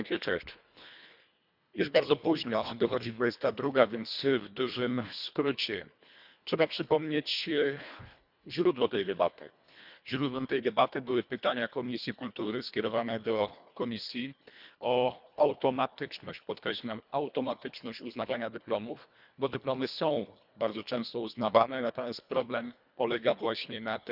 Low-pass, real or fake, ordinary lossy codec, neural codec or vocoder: 5.4 kHz; fake; none; codec, 24 kHz, 1.5 kbps, HILCodec